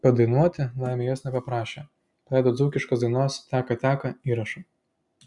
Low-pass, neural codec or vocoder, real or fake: 10.8 kHz; none; real